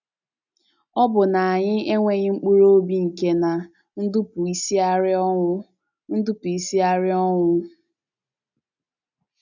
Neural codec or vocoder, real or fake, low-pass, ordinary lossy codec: none; real; 7.2 kHz; none